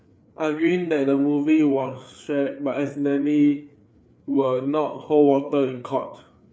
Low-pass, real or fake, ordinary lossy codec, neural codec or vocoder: none; fake; none; codec, 16 kHz, 4 kbps, FreqCodec, larger model